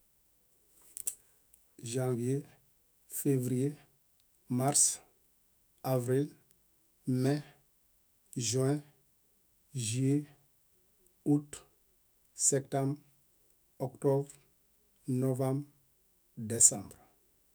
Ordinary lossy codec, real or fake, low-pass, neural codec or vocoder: none; fake; none; autoencoder, 48 kHz, 128 numbers a frame, DAC-VAE, trained on Japanese speech